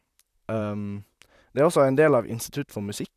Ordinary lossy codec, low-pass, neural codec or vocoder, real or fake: none; 14.4 kHz; vocoder, 44.1 kHz, 128 mel bands, Pupu-Vocoder; fake